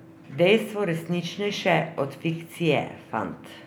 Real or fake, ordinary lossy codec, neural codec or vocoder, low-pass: real; none; none; none